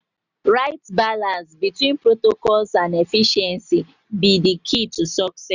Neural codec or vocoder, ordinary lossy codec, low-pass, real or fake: none; none; 7.2 kHz; real